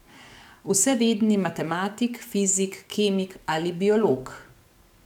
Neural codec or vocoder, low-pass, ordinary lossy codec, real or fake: codec, 44.1 kHz, 7.8 kbps, DAC; 19.8 kHz; none; fake